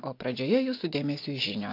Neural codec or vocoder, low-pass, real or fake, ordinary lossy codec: none; 5.4 kHz; real; AAC, 32 kbps